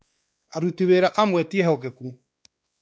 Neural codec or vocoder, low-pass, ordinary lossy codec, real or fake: codec, 16 kHz, 2 kbps, X-Codec, WavLM features, trained on Multilingual LibriSpeech; none; none; fake